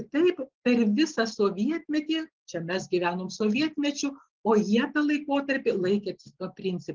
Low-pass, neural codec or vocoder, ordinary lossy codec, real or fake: 7.2 kHz; none; Opus, 16 kbps; real